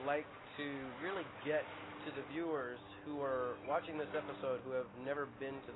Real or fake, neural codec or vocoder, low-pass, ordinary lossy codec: real; none; 7.2 kHz; AAC, 16 kbps